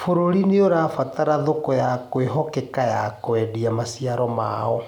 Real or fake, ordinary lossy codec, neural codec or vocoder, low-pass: real; none; none; 19.8 kHz